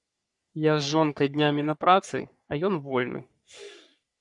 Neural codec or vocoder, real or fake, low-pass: codec, 44.1 kHz, 3.4 kbps, Pupu-Codec; fake; 10.8 kHz